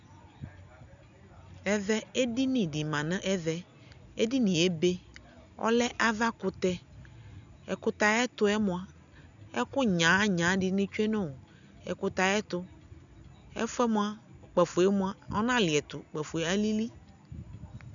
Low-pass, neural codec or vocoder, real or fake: 7.2 kHz; none; real